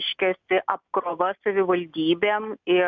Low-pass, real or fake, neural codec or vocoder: 7.2 kHz; real; none